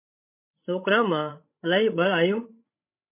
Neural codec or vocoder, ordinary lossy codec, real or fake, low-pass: codec, 16 kHz, 16 kbps, FreqCodec, larger model; MP3, 24 kbps; fake; 3.6 kHz